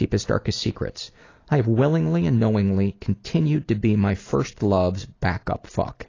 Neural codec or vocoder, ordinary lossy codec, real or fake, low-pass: none; AAC, 32 kbps; real; 7.2 kHz